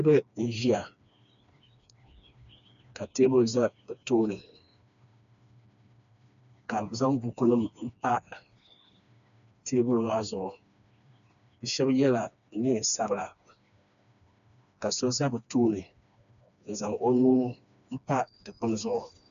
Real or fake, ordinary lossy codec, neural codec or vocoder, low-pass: fake; AAC, 96 kbps; codec, 16 kHz, 2 kbps, FreqCodec, smaller model; 7.2 kHz